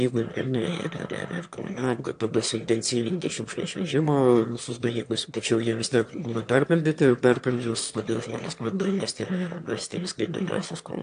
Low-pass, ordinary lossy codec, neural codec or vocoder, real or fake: 9.9 kHz; AAC, 64 kbps; autoencoder, 22.05 kHz, a latent of 192 numbers a frame, VITS, trained on one speaker; fake